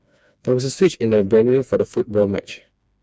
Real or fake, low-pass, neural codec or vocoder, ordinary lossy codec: fake; none; codec, 16 kHz, 2 kbps, FreqCodec, smaller model; none